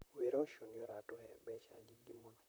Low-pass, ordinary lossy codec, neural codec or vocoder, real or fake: none; none; vocoder, 44.1 kHz, 128 mel bands, Pupu-Vocoder; fake